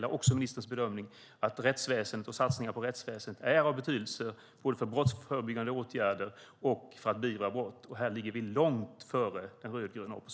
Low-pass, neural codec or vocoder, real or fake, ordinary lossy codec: none; none; real; none